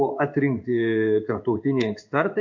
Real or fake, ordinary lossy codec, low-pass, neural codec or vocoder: fake; MP3, 64 kbps; 7.2 kHz; codec, 16 kHz in and 24 kHz out, 1 kbps, XY-Tokenizer